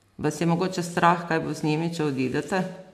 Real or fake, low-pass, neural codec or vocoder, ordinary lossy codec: real; 14.4 kHz; none; none